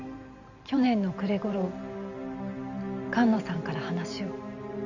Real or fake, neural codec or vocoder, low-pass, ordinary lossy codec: fake; vocoder, 44.1 kHz, 128 mel bands every 512 samples, BigVGAN v2; 7.2 kHz; none